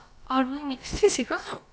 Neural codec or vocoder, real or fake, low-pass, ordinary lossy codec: codec, 16 kHz, about 1 kbps, DyCAST, with the encoder's durations; fake; none; none